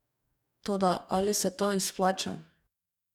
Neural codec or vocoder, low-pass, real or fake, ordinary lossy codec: codec, 44.1 kHz, 2.6 kbps, DAC; 19.8 kHz; fake; none